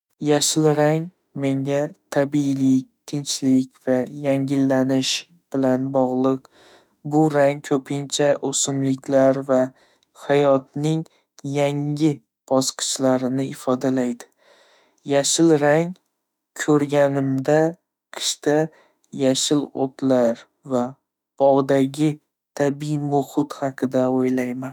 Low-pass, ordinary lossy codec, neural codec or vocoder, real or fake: 19.8 kHz; none; autoencoder, 48 kHz, 32 numbers a frame, DAC-VAE, trained on Japanese speech; fake